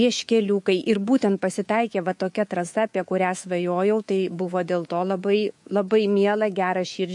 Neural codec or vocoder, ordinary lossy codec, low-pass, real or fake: codec, 24 kHz, 3.1 kbps, DualCodec; MP3, 48 kbps; 10.8 kHz; fake